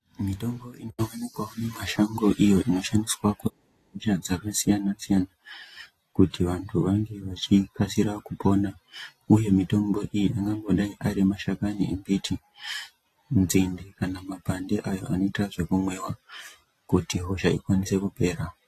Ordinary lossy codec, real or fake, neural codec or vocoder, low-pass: AAC, 48 kbps; real; none; 14.4 kHz